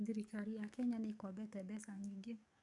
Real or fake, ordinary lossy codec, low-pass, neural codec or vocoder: fake; none; 10.8 kHz; codec, 44.1 kHz, 7.8 kbps, DAC